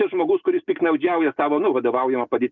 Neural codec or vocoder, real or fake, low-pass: none; real; 7.2 kHz